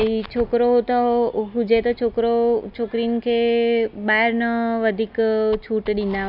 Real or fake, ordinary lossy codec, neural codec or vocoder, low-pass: real; none; none; 5.4 kHz